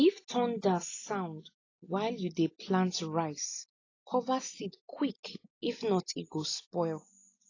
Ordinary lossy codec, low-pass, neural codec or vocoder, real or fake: AAC, 32 kbps; 7.2 kHz; none; real